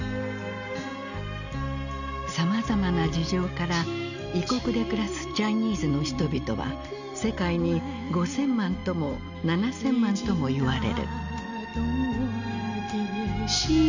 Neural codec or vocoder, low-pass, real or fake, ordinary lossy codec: none; 7.2 kHz; real; none